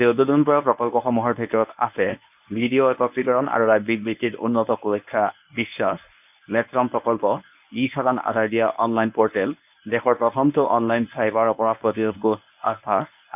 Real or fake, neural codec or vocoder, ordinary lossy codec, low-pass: fake; codec, 24 kHz, 0.9 kbps, WavTokenizer, medium speech release version 1; none; 3.6 kHz